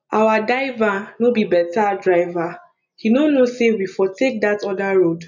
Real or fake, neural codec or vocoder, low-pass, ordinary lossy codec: real; none; 7.2 kHz; none